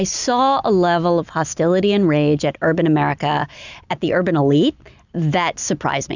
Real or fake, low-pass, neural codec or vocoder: real; 7.2 kHz; none